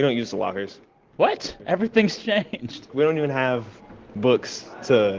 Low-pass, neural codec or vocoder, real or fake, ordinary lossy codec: 7.2 kHz; none; real; Opus, 16 kbps